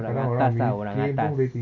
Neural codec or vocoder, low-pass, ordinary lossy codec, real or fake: none; 7.2 kHz; none; real